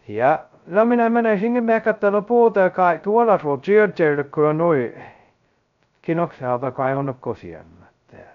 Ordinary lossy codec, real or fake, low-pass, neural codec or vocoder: none; fake; 7.2 kHz; codec, 16 kHz, 0.2 kbps, FocalCodec